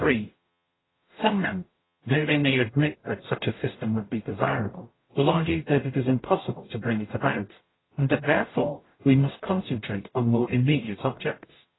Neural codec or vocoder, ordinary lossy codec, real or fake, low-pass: codec, 44.1 kHz, 0.9 kbps, DAC; AAC, 16 kbps; fake; 7.2 kHz